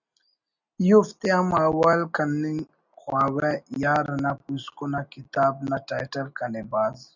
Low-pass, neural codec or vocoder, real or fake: 7.2 kHz; none; real